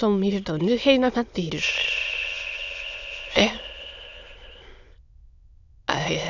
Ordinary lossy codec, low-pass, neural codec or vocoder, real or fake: none; 7.2 kHz; autoencoder, 22.05 kHz, a latent of 192 numbers a frame, VITS, trained on many speakers; fake